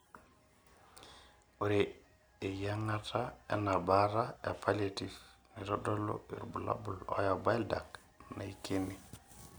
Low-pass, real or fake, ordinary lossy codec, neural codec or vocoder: none; real; none; none